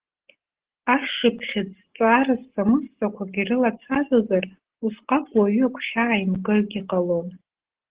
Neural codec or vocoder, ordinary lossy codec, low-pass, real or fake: none; Opus, 16 kbps; 3.6 kHz; real